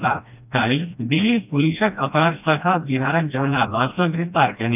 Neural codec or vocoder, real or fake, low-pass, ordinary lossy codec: codec, 16 kHz, 1 kbps, FreqCodec, smaller model; fake; 3.6 kHz; none